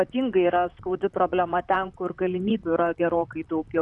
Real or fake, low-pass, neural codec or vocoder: fake; 10.8 kHz; vocoder, 44.1 kHz, 128 mel bands, Pupu-Vocoder